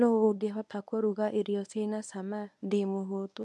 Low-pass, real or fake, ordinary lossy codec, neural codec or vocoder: none; fake; none; codec, 24 kHz, 0.9 kbps, WavTokenizer, medium speech release version 1